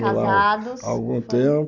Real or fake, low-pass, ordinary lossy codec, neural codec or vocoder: real; 7.2 kHz; none; none